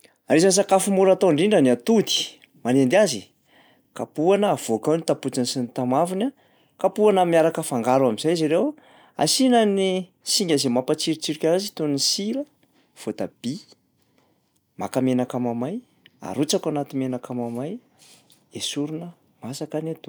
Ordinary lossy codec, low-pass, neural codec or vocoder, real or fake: none; none; none; real